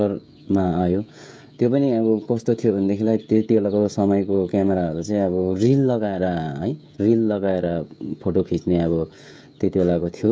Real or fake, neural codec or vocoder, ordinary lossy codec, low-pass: fake; codec, 16 kHz, 16 kbps, FreqCodec, smaller model; none; none